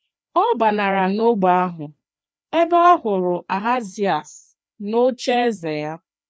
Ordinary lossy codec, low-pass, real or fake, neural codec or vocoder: none; none; fake; codec, 16 kHz, 2 kbps, FreqCodec, larger model